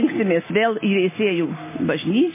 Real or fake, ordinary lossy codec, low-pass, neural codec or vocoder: real; MP3, 16 kbps; 3.6 kHz; none